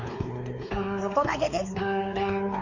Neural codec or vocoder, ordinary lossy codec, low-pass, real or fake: codec, 16 kHz, 4 kbps, X-Codec, WavLM features, trained on Multilingual LibriSpeech; none; 7.2 kHz; fake